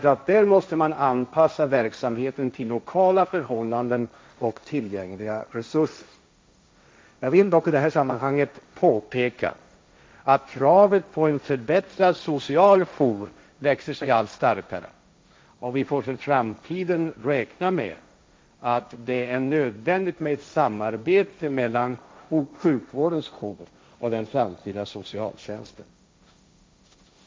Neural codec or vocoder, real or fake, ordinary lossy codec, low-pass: codec, 16 kHz, 1.1 kbps, Voila-Tokenizer; fake; none; none